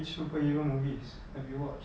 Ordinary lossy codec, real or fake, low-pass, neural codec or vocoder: none; real; none; none